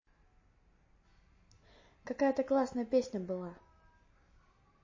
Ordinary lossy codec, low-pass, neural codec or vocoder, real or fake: MP3, 32 kbps; 7.2 kHz; none; real